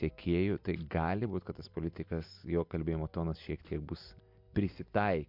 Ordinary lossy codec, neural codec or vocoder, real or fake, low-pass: MP3, 48 kbps; none; real; 5.4 kHz